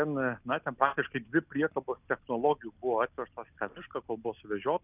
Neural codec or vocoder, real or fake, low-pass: none; real; 3.6 kHz